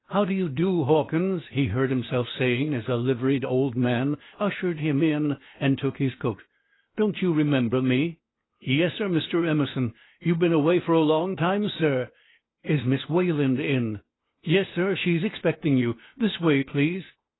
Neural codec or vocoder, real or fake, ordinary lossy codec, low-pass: codec, 16 kHz, 0.8 kbps, ZipCodec; fake; AAC, 16 kbps; 7.2 kHz